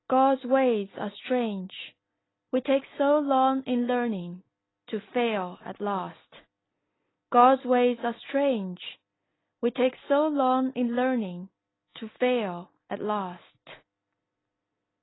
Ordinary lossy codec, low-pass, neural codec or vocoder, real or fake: AAC, 16 kbps; 7.2 kHz; none; real